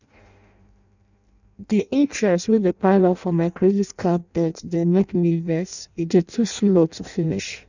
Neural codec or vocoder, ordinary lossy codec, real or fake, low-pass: codec, 16 kHz in and 24 kHz out, 0.6 kbps, FireRedTTS-2 codec; none; fake; 7.2 kHz